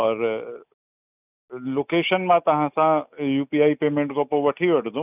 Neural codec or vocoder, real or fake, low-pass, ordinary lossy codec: none; real; 3.6 kHz; none